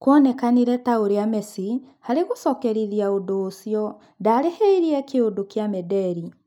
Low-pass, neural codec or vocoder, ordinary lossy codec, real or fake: 19.8 kHz; none; none; real